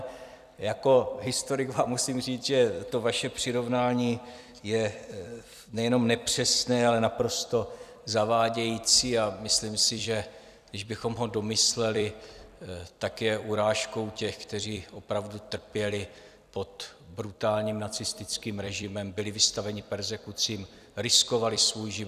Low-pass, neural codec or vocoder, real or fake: 14.4 kHz; vocoder, 44.1 kHz, 128 mel bands every 256 samples, BigVGAN v2; fake